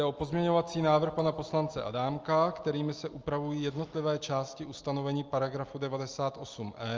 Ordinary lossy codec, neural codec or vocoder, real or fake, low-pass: Opus, 24 kbps; none; real; 7.2 kHz